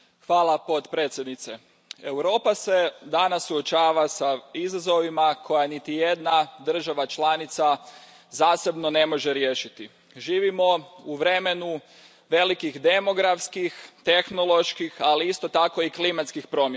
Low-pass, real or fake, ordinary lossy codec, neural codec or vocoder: none; real; none; none